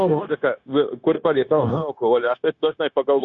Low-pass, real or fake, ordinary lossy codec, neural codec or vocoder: 7.2 kHz; fake; Opus, 64 kbps; codec, 16 kHz, 0.9 kbps, LongCat-Audio-Codec